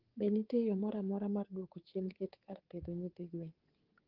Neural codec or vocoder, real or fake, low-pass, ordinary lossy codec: codec, 24 kHz, 3.1 kbps, DualCodec; fake; 5.4 kHz; Opus, 16 kbps